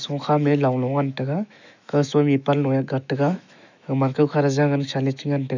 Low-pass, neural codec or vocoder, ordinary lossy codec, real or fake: 7.2 kHz; autoencoder, 48 kHz, 128 numbers a frame, DAC-VAE, trained on Japanese speech; none; fake